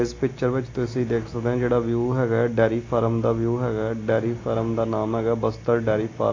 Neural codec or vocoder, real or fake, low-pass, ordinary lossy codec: none; real; 7.2 kHz; AAC, 32 kbps